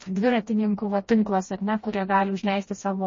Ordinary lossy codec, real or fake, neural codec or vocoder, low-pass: MP3, 32 kbps; fake; codec, 16 kHz, 1 kbps, FreqCodec, smaller model; 7.2 kHz